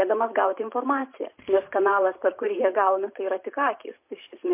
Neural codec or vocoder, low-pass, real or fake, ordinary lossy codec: vocoder, 44.1 kHz, 128 mel bands every 512 samples, BigVGAN v2; 3.6 kHz; fake; MP3, 32 kbps